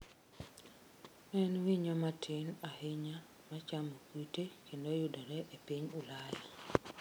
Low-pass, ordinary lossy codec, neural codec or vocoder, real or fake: none; none; none; real